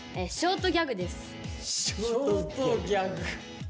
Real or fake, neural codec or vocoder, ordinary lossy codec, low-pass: real; none; none; none